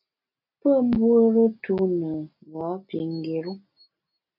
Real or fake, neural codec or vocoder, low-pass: real; none; 5.4 kHz